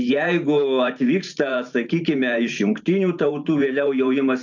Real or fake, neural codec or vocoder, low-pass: fake; vocoder, 44.1 kHz, 128 mel bands every 512 samples, BigVGAN v2; 7.2 kHz